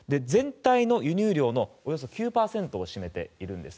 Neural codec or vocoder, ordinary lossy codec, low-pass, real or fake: none; none; none; real